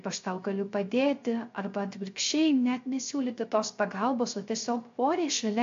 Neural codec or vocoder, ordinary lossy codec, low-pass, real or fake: codec, 16 kHz, 0.3 kbps, FocalCodec; AAC, 48 kbps; 7.2 kHz; fake